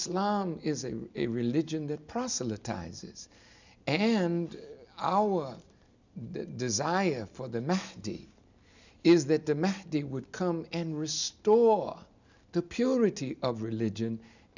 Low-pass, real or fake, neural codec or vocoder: 7.2 kHz; real; none